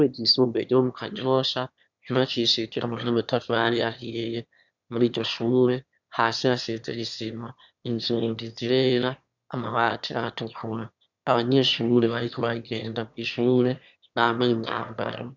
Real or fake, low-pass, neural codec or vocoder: fake; 7.2 kHz; autoencoder, 22.05 kHz, a latent of 192 numbers a frame, VITS, trained on one speaker